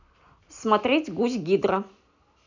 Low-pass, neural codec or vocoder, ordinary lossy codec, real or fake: 7.2 kHz; none; none; real